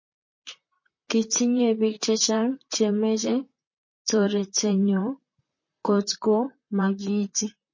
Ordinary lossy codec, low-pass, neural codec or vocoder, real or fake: MP3, 32 kbps; 7.2 kHz; vocoder, 44.1 kHz, 128 mel bands, Pupu-Vocoder; fake